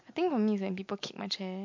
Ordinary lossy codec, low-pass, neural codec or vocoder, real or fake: MP3, 48 kbps; 7.2 kHz; none; real